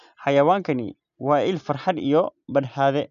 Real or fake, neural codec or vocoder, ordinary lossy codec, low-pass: real; none; none; 7.2 kHz